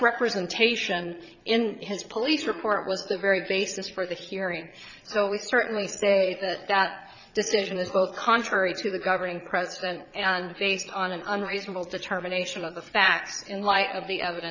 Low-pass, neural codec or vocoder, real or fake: 7.2 kHz; vocoder, 22.05 kHz, 80 mel bands, Vocos; fake